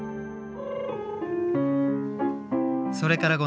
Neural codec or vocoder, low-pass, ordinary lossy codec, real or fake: none; none; none; real